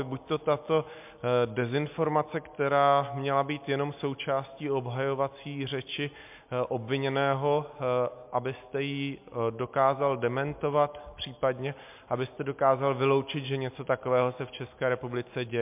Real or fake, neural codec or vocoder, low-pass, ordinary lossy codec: real; none; 3.6 kHz; MP3, 32 kbps